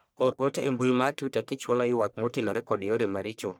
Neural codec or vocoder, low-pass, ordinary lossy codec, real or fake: codec, 44.1 kHz, 1.7 kbps, Pupu-Codec; none; none; fake